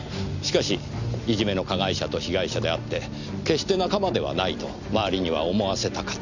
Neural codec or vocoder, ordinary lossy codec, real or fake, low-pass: none; none; real; 7.2 kHz